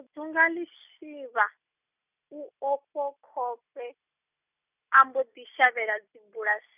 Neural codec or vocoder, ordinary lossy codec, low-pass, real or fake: none; none; 3.6 kHz; real